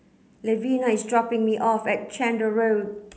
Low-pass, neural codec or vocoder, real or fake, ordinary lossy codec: none; none; real; none